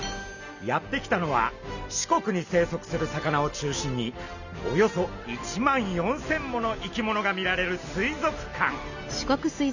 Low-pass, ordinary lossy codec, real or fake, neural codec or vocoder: 7.2 kHz; none; real; none